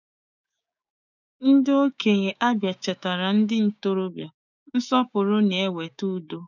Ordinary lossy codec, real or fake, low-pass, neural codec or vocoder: none; fake; 7.2 kHz; codec, 16 kHz, 6 kbps, DAC